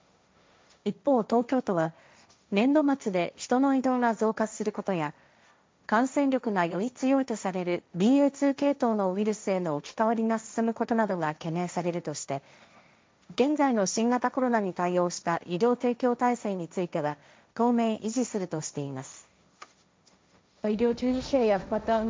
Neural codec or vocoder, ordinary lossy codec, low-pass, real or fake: codec, 16 kHz, 1.1 kbps, Voila-Tokenizer; none; none; fake